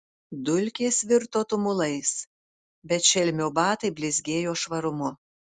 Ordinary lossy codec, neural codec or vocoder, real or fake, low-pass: Opus, 64 kbps; none; real; 10.8 kHz